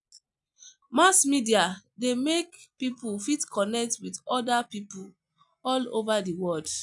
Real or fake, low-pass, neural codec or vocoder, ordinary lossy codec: real; 10.8 kHz; none; none